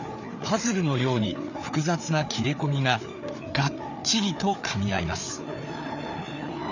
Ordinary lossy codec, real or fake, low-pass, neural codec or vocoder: none; fake; 7.2 kHz; codec, 16 kHz, 4 kbps, FreqCodec, larger model